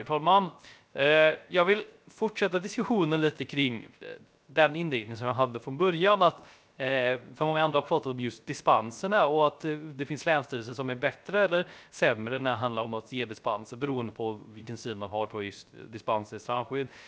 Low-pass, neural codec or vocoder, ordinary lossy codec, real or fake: none; codec, 16 kHz, 0.3 kbps, FocalCodec; none; fake